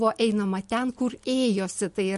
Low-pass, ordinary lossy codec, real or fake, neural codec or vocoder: 14.4 kHz; MP3, 48 kbps; real; none